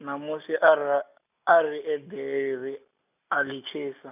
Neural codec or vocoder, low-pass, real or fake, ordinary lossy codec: none; 3.6 kHz; real; none